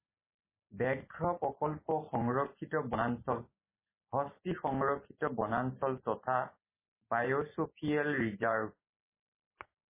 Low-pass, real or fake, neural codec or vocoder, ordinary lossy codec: 3.6 kHz; real; none; MP3, 16 kbps